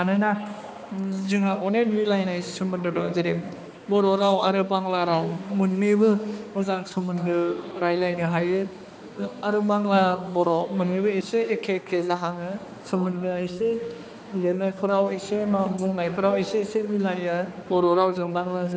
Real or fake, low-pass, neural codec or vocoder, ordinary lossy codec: fake; none; codec, 16 kHz, 2 kbps, X-Codec, HuBERT features, trained on balanced general audio; none